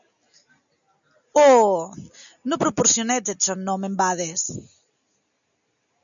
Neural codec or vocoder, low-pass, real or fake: none; 7.2 kHz; real